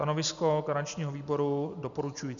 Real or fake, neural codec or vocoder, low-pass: real; none; 7.2 kHz